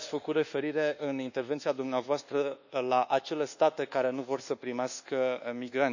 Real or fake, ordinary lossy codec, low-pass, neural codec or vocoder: fake; none; 7.2 kHz; codec, 24 kHz, 1.2 kbps, DualCodec